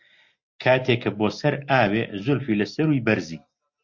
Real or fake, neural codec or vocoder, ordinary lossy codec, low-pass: real; none; MP3, 48 kbps; 7.2 kHz